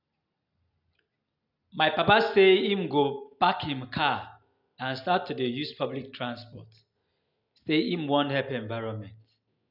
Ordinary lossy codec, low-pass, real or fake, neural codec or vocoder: none; 5.4 kHz; real; none